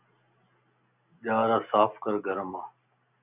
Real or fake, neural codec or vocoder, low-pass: real; none; 3.6 kHz